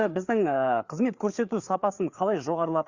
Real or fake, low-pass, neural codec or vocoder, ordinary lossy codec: fake; 7.2 kHz; codec, 16 kHz, 4 kbps, FreqCodec, larger model; Opus, 64 kbps